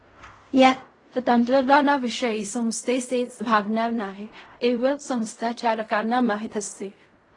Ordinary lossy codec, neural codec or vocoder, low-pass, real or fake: AAC, 32 kbps; codec, 16 kHz in and 24 kHz out, 0.4 kbps, LongCat-Audio-Codec, fine tuned four codebook decoder; 10.8 kHz; fake